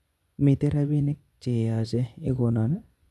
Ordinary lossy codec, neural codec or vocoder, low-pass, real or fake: none; vocoder, 24 kHz, 100 mel bands, Vocos; none; fake